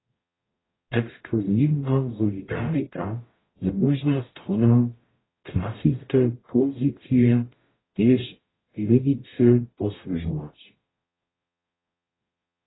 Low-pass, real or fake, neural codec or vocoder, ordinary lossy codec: 7.2 kHz; fake; codec, 44.1 kHz, 0.9 kbps, DAC; AAC, 16 kbps